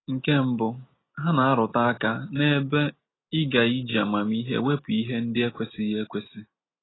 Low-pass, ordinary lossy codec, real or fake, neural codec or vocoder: 7.2 kHz; AAC, 16 kbps; real; none